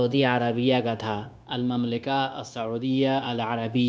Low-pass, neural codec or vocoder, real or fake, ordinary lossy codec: none; codec, 16 kHz, 0.9 kbps, LongCat-Audio-Codec; fake; none